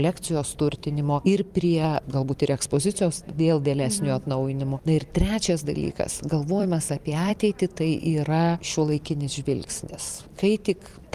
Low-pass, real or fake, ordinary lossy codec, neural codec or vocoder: 14.4 kHz; fake; Opus, 32 kbps; vocoder, 44.1 kHz, 128 mel bands every 256 samples, BigVGAN v2